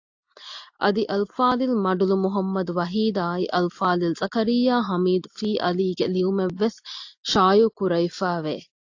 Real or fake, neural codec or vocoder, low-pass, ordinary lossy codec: real; none; 7.2 kHz; AAC, 48 kbps